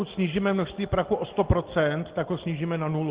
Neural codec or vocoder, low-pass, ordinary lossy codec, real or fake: none; 3.6 kHz; Opus, 16 kbps; real